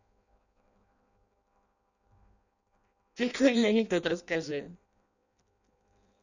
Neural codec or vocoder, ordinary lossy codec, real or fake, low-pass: codec, 16 kHz in and 24 kHz out, 0.6 kbps, FireRedTTS-2 codec; none; fake; 7.2 kHz